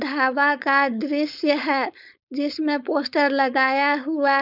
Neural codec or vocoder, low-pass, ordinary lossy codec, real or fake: codec, 16 kHz, 4.8 kbps, FACodec; 5.4 kHz; none; fake